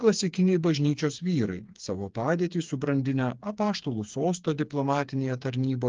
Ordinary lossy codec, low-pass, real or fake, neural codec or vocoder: Opus, 32 kbps; 7.2 kHz; fake; codec, 16 kHz, 4 kbps, FreqCodec, smaller model